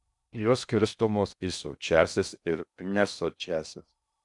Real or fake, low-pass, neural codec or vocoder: fake; 10.8 kHz; codec, 16 kHz in and 24 kHz out, 0.6 kbps, FocalCodec, streaming, 2048 codes